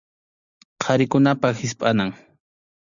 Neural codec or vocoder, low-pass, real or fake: none; 7.2 kHz; real